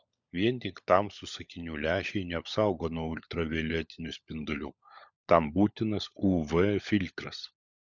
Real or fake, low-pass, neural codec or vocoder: fake; 7.2 kHz; codec, 16 kHz, 16 kbps, FunCodec, trained on LibriTTS, 50 frames a second